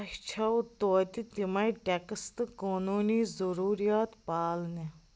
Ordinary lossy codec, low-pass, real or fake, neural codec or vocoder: none; none; real; none